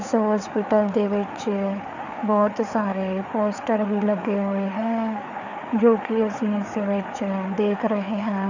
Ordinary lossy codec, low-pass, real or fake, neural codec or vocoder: none; 7.2 kHz; fake; codec, 16 kHz, 4 kbps, FreqCodec, larger model